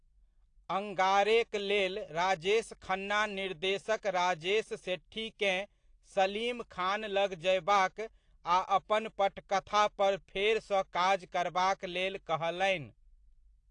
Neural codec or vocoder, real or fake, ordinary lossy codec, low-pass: none; real; AAC, 48 kbps; 9.9 kHz